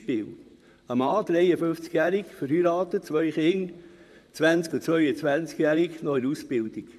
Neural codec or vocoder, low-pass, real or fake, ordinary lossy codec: vocoder, 44.1 kHz, 128 mel bands, Pupu-Vocoder; 14.4 kHz; fake; none